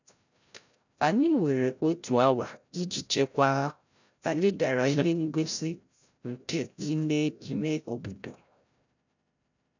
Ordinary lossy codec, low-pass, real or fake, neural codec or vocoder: none; 7.2 kHz; fake; codec, 16 kHz, 0.5 kbps, FreqCodec, larger model